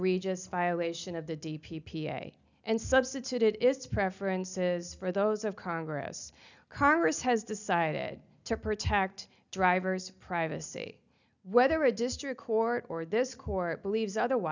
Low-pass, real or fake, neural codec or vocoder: 7.2 kHz; real; none